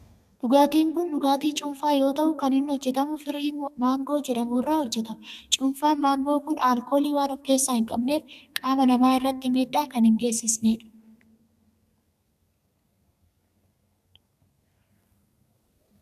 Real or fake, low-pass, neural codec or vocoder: fake; 14.4 kHz; codec, 32 kHz, 1.9 kbps, SNAC